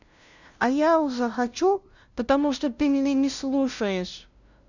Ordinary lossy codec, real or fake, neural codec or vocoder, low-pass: none; fake; codec, 16 kHz, 0.5 kbps, FunCodec, trained on LibriTTS, 25 frames a second; 7.2 kHz